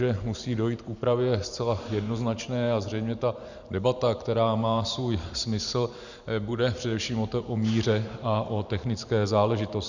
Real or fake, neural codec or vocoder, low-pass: fake; vocoder, 44.1 kHz, 128 mel bands every 512 samples, BigVGAN v2; 7.2 kHz